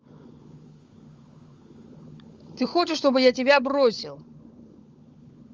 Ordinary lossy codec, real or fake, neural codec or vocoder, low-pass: Opus, 32 kbps; fake; codec, 16 kHz, 16 kbps, FunCodec, trained on LibriTTS, 50 frames a second; 7.2 kHz